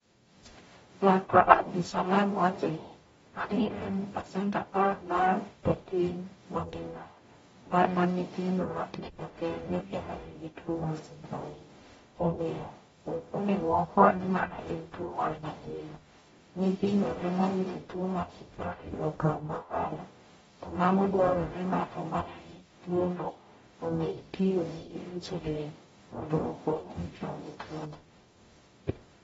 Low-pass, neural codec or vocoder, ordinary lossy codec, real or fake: 19.8 kHz; codec, 44.1 kHz, 0.9 kbps, DAC; AAC, 24 kbps; fake